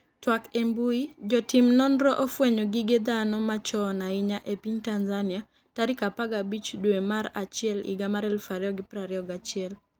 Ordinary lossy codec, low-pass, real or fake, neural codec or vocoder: Opus, 32 kbps; 19.8 kHz; real; none